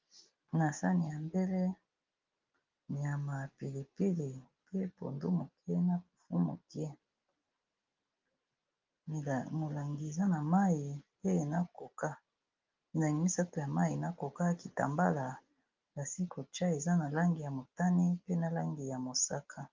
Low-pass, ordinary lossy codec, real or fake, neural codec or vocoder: 7.2 kHz; Opus, 32 kbps; real; none